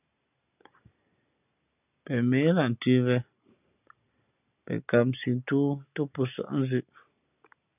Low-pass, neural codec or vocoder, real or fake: 3.6 kHz; none; real